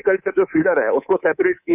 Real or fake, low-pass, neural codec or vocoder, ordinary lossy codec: fake; 3.6 kHz; codec, 24 kHz, 3.1 kbps, DualCodec; none